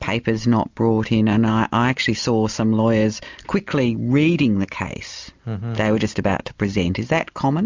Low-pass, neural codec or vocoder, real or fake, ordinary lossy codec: 7.2 kHz; none; real; MP3, 64 kbps